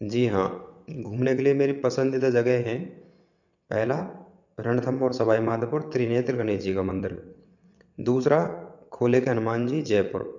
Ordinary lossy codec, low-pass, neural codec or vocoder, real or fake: none; 7.2 kHz; vocoder, 22.05 kHz, 80 mel bands, WaveNeXt; fake